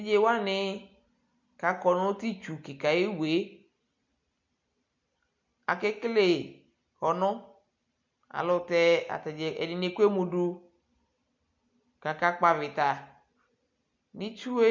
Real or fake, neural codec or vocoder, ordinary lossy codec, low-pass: real; none; MP3, 48 kbps; 7.2 kHz